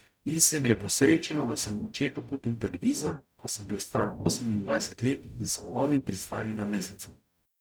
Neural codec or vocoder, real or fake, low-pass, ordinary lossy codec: codec, 44.1 kHz, 0.9 kbps, DAC; fake; none; none